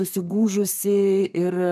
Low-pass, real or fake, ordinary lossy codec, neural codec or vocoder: 14.4 kHz; fake; MP3, 96 kbps; codec, 32 kHz, 1.9 kbps, SNAC